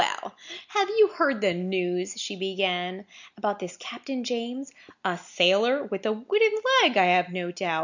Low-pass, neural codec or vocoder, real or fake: 7.2 kHz; none; real